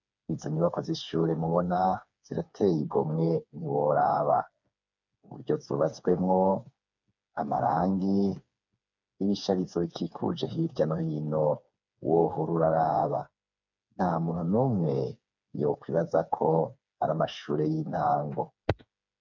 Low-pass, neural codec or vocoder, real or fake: 7.2 kHz; codec, 16 kHz, 4 kbps, FreqCodec, smaller model; fake